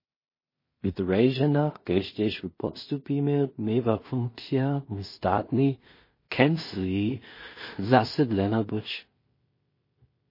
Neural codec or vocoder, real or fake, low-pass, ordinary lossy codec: codec, 16 kHz in and 24 kHz out, 0.4 kbps, LongCat-Audio-Codec, two codebook decoder; fake; 5.4 kHz; MP3, 24 kbps